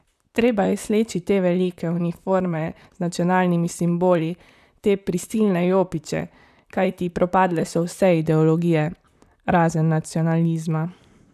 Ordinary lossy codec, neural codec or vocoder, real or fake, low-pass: none; vocoder, 44.1 kHz, 128 mel bands, Pupu-Vocoder; fake; 14.4 kHz